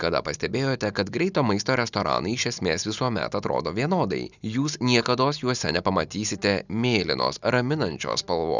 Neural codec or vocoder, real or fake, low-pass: none; real; 7.2 kHz